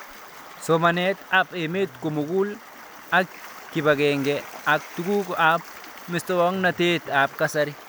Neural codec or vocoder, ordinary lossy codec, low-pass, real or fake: none; none; none; real